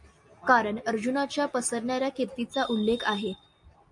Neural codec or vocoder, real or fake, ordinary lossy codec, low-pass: none; real; MP3, 64 kbps; 10.8 kHz